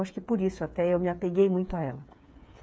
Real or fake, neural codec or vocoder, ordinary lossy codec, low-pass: fake; codec, 16 kHz, 8 kbps, FreqCodec, smaller model; none; none